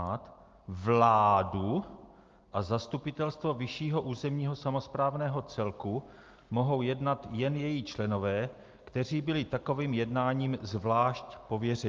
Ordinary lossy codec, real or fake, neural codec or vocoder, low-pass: Opus, 32 kbps; real; none; 7.2 kHz